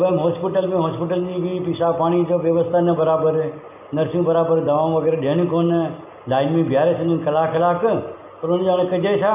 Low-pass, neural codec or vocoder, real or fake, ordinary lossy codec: 3.6 kHz; none; real; none